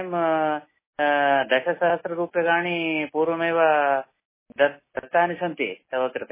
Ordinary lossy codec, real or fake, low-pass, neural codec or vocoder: MP3, 16 kbps; real; 3.6 kHz; none